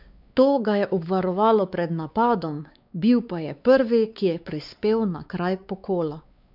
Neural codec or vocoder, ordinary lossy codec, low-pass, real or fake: codec, 16 kHz, 4 kbps, X-Codec, WavLM features, trained on Multilingual LibriSpeech; none; 5.4 kHz; fake